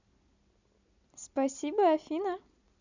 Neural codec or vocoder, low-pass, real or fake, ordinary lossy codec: none; 7.2 kHz; real; none